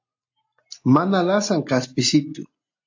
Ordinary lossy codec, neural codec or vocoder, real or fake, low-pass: AAC, 48 kbps; none; real; 7.2 kHz